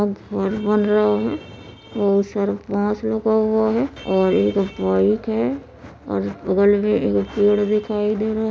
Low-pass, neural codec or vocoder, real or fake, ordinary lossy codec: none; none; real; none